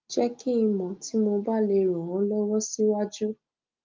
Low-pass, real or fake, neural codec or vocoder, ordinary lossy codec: 7.2 kHz; real; none; Opus, 24 kbps